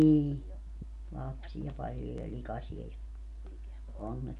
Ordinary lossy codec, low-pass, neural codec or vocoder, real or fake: none; 10.8 kHz; none; real